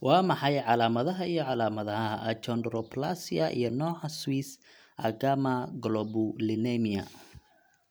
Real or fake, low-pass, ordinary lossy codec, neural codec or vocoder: real; none; none; none